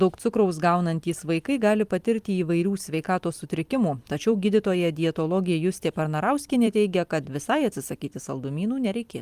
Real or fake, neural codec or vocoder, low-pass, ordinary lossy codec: fake; vocoder, 44.1 kHz, 128 mel bands every 256 samples, BigVGAN v2; 14.4 kHz; Opus, 32 kbps